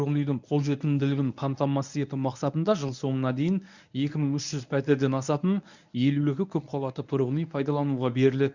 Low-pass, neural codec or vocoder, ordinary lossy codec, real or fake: 7.2 kHz; codec, 24 kHz, 0.9 kbps, WavTokenizer, medium speech release version 1; none; fake